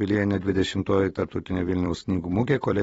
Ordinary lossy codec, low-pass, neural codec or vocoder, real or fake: AAC, 24 kbps; 19.8 kHz; vocoder, 44.1 kHz, 128 mel bands every 256 samples, BigVGAN v2; fake